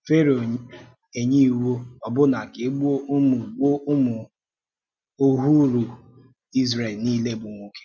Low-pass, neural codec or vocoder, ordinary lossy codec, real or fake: none; none; none; real